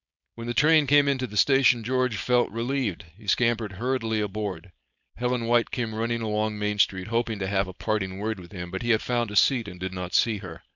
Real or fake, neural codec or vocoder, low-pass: fake; codec, 16 kHz, 4.8 kbps, FACodec; 7.2 kHz